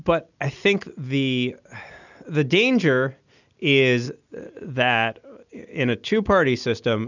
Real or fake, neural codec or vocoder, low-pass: real; none; 7.2 kHz